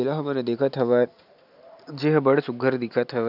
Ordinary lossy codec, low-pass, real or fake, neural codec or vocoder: none; 5.4 kHz; real; none